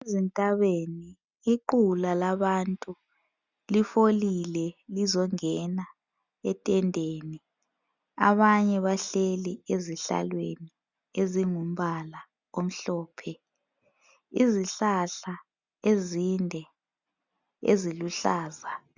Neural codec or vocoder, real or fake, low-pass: none; real; 7.2 kHz